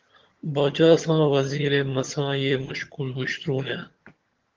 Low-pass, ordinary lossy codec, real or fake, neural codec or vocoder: 7.2 kHz; Opus, 16 kbps; fake; vocoder, 22.05 kHz, 80 mel bands, HiFi-GAN